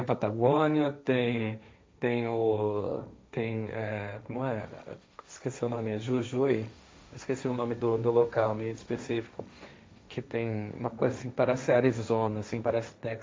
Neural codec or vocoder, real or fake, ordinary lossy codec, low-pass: codec, 16 kHz, 1.1 kbps, Voila-Tokenizer; fake; none; none